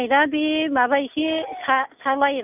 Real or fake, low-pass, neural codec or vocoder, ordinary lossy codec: real; 3.6 kHz; none; none